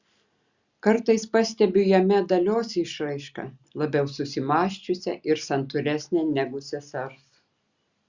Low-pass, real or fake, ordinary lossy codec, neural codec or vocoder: 7.2 kHz; real; Opus, 64 kbps; none